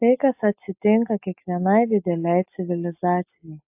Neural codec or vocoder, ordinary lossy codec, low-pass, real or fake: none; AAC, 32 kbps; 3.6 kHz; real